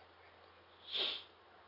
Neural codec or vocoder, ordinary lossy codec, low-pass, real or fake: none; none; 5.4 kHz; real